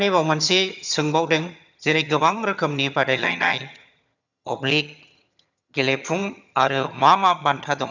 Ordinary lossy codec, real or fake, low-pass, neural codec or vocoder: none; fake; 7.2 kHz; vocoder, 22.05 kHz, 80 mel bands, HiFi-GAN